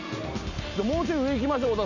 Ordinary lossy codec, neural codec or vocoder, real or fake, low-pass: none; none; real; 7.2 kHz